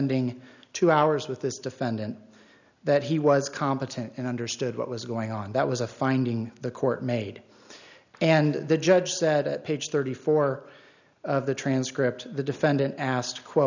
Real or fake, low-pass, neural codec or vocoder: real; 7.2 kHz; none